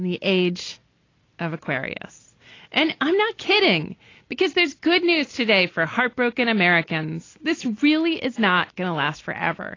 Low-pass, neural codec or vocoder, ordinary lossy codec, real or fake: 7.2 kHz; none; AAC, 32 kbps; real